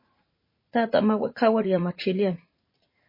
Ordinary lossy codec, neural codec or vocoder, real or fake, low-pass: MP3, 24 kbps; vocoder, 44.1 kHz, 80 mel bands, Vocos; fake; 5.4 kHz